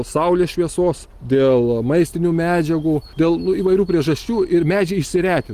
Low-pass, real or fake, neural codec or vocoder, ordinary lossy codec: 14.4 kHz; real; none; Opus, 24 kbps